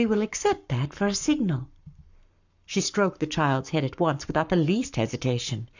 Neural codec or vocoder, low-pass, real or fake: codec, 44.1 kHz, 7.8 kbps, Pupu-Codec; 7.2 kHz; fake